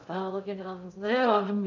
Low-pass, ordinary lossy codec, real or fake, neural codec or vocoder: 7.2 kHz; none; fake; codec, 16 kHz in and 24 kHz out, 0.6 kbps, FocalCodec, streaming, 2048 codes